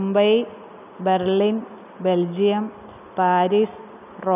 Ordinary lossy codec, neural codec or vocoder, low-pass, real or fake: none; none; 3.6 kHz; real